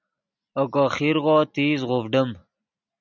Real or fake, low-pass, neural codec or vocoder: real; 7.2 kHz; none